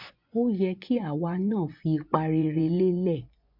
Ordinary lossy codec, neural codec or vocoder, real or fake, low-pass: MP3, 48 kbps; vocoder, 24 kHz, 100 mel bands, Vocos; fake; 5.4 kHz